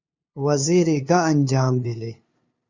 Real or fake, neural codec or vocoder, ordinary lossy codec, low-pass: fake; codec, 16 kHz, 2 kbps, FunCodec, trained on LibriTTS, 25 frames a second; Opus, 64 kbps; 7.2 kHz